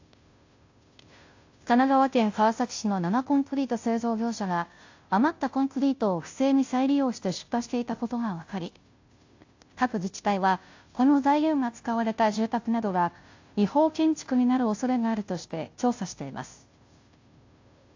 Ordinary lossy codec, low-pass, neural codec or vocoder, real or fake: AAC, 48 kbps; 7.2 kHz; codec, 16 kHz, 0.5 kbps, FunCodec, trained on Chinese and English, 25 frames a second; fake